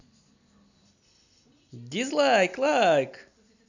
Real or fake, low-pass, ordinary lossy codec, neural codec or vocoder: real; 7.2 kHz; none; none